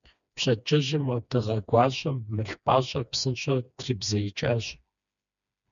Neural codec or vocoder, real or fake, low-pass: codec, 16 kHz, 2 kbps, FreqCodec, smaller model; fake; 7.2 kHz